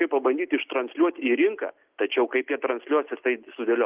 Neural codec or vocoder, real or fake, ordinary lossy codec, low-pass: none; real; Opus, 32 kbps; 3.6 kHz